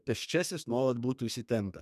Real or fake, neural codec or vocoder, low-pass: fake; codec, 32 kHz, 1.9 kbps, SNAC; 14.4 kHz